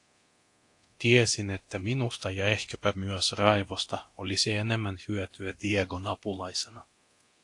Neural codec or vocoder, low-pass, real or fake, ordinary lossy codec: codec, 24 kHz, 0.9 kbps, DualCodec; 10.8 kHz; fake; AAC, 48 kbps